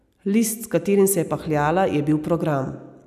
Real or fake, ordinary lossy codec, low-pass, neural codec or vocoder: real; none; 14.4 kHz; none